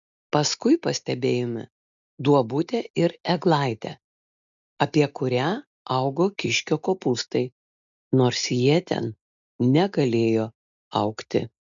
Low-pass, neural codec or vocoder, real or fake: 7.2 kHz; none; real